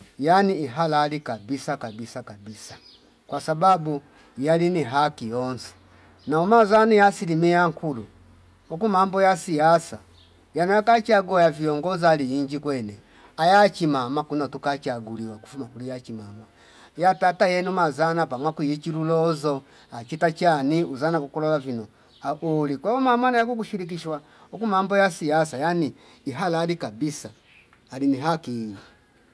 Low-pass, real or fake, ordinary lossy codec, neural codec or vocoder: none; real; none; none